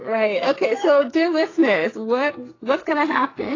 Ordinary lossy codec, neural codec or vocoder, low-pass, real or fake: AAC, 32 kbps; codec, 44.1 kHz, 2.6 kbps, SNAC; 7.2 kHz; fake